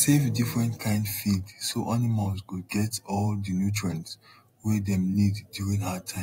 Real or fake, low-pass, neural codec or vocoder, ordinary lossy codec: fake; 19.8 kHz; vocoder, 48 kHz, 128 mel bands, Vocos; AAC, 48 kbps